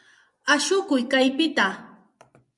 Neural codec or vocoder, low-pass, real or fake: vocoder, 24 kHz, 100 mel bands, Vocos; 10.8 kHz; fake